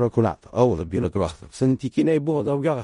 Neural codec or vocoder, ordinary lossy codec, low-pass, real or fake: codec, 16 kHz in and 24 kHz out, 0.4 kbps, LongCat-Audio-Codec, four codebook decoder; MP3, 48 kbps; 10.8 kHz; fake